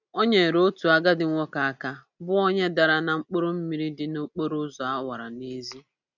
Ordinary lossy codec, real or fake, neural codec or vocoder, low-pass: none; real; none; 7.2 kHz